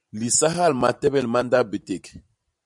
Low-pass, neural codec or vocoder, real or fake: 10.8 kHz; vocoder, 44.1 kHz, 128 mel bands every 512 samples, BigVGAN v2; fake